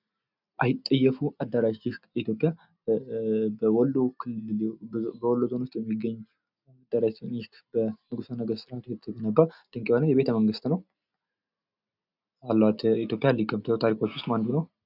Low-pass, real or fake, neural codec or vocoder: 5.4 kHz; real; none